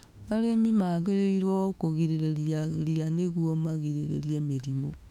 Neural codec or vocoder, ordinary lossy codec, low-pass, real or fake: autoencoder, 48 kHz, 32 numbers a frame, DAC-VAE, trained on Japanese speech; none; 19.8 kHz; fake